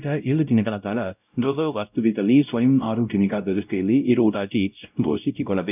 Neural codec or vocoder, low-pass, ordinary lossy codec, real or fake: codec, 16 kHz, 0.5 kbps, X-Codec, WavLM features, trained on Multilingual LibriSpeech; 3.6 kHz; none; fake